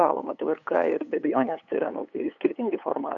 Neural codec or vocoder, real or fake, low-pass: codec, 16 kHz, 8 kbps, FunCodec, trained on LibriTTS, 25 frames a second; fake; 7.2 kHz